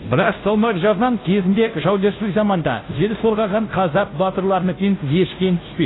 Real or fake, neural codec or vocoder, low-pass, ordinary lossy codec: fake; codec, 16 kHz, 0.5 kbps, FunCodec, trained on Chinese and English, 25 frames a second; 7.2 kHz; AAC, 16 kbps